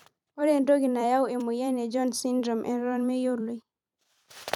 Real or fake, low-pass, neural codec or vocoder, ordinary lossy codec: fake; 19.8 kHz; vocoder, 44.1 kHz, 128 mel bands every 256 samples, BigVGAN v2; none